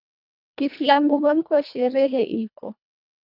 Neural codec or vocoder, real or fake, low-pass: codec, 24 kHz, 1.5 kbps, HILCodec; fake; 5.4 kHz